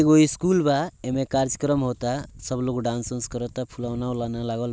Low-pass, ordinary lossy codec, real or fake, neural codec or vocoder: none; none; real; none